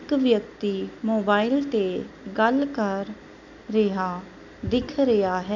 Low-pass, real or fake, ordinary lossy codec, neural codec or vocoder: 7.2 kHz; real; none; none